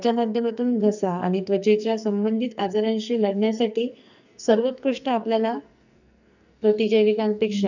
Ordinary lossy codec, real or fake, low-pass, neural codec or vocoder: none; fake; 7.2 kHz; codec, 44.1 kHz, 2.6 kbps, SNAC